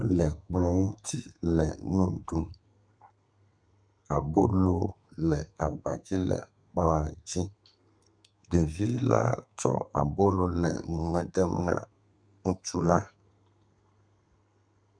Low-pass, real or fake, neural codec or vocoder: 9.9 kHz; fake; codec, 32 kHz, 1.9 kbps, SNAC